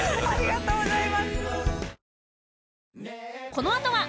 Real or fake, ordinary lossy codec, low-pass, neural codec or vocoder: real; none; none; none